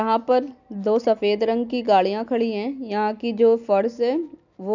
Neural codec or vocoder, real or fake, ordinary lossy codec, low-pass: none; real; none; 7.2 kHz